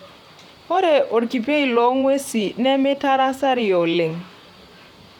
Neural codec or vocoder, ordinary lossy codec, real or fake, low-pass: vocoder, 44.1 kHz, 128 mel bands, Pupu-Vocoder; none; fake; 19.8 kHz